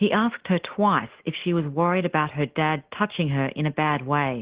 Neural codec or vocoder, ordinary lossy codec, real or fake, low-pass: none; Opus, 16 kbps; real; 3.6 kHz